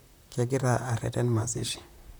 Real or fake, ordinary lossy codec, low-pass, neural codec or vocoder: fake; none; none; vocoder, 44.1 kHz, 128 mel bands, Pupu-Vocoder